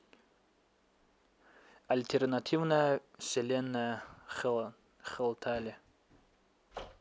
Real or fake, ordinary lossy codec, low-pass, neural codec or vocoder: real; none; none; none